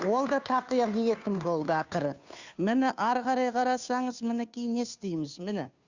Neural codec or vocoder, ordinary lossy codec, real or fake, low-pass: codec, 16 kHz, 2 kbps, FunCodec, trained on Chinese and English, 25 frames a second; Opus, 64 kbps; fake; 7.2 kHz